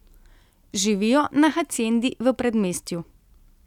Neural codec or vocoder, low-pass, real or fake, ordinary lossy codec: none; 19.8 kHz; real; none